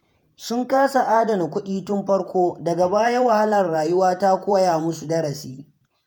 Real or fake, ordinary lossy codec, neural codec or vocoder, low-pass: fake; none; vocoder, 48 kHz, 128 mel bands, Vocos; none